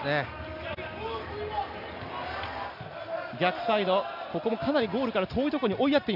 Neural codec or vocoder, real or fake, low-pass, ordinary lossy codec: none; real; 5.4 kHz; none